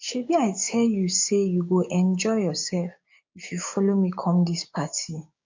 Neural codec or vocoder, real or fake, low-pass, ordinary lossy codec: codec, 16 kHz, 6 kbps, DAC; fake; 7.2 kHz; MP3, 48 kbps